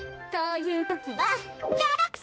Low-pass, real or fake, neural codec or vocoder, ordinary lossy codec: none; fake; codec, 16 kHz, 1 kbps, X-Codec, HuBERT features, trained on balanced general audio; none